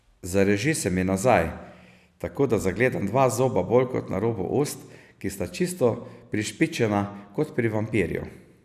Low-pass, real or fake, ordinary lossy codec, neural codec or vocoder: 14.4 kHz; real; none; none